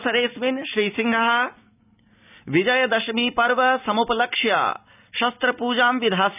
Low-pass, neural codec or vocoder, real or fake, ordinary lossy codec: 3.6 kHz; none; real; none